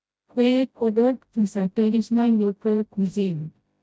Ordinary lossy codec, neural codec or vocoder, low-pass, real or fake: none; codec, 16 kHz, 0.5 kbps, FreqCodec, smaller model; none; fake